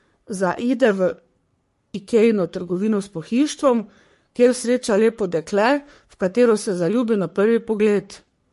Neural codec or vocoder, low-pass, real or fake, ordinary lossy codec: codec, 44.1 kHz, 3.4 kbps, Pupu-Codec; 14.4 kHz; fake; MP3, 48 kbps